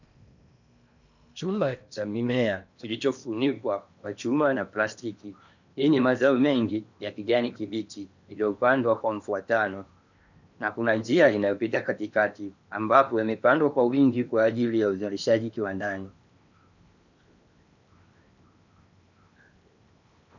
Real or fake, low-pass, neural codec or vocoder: fake; 7.2 kHz; codec, 16 kHz in and 24 kHz out, 0.8 kbps, FocalCodec, streaming, 65536 codes